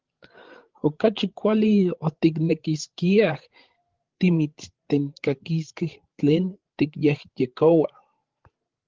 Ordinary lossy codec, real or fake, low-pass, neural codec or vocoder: Opus, 16 kbps; fake; 7.2 kHz; codec, 16 kHz, 16 kbps, FreqCodec, larger model